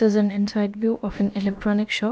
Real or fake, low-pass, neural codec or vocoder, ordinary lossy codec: fake; none; codec, 16 kHz, about 1 kbps, DyCAST, with the encoder's durations; none